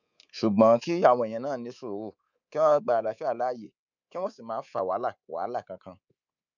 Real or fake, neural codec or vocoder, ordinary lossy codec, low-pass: fake; codec, 24 kHz, 3.1 kbps, DualCodec; none; 7.2 kHz